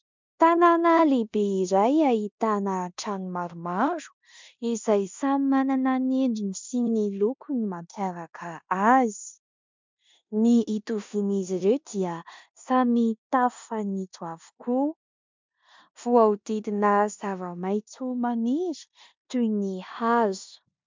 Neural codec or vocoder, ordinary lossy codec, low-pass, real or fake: codec, 16 kHz in and 24 kHz out, 0.9 kbps, LongCat-Audio-Codec, four codebook decoder; MP3, 64 kbps; 7.2 kHz; fake